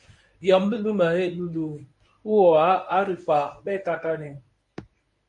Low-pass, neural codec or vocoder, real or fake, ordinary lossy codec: 10.8 kHz; codec, 24 kHz, 0.9 kbps, WavTokenizer, medium speech release version 1; fake; MP3, 48 kbps